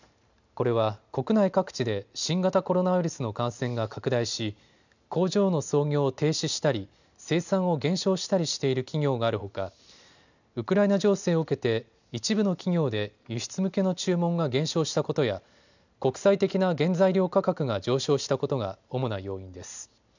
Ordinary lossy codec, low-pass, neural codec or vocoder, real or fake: none; 7.2 kHz; none; real